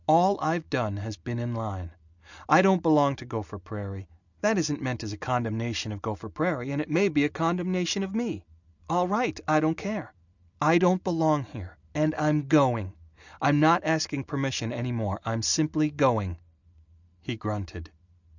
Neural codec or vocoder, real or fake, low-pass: none; real; 7.2 kHz